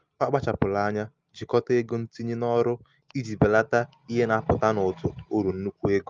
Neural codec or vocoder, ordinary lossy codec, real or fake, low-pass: none; Opus, 32 kbps; real; 7.2 kHz